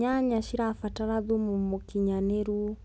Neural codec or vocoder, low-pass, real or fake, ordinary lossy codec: none; none; real; none